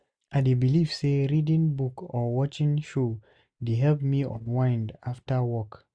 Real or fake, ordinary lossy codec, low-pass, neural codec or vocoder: real; none; 9.9 kHz; none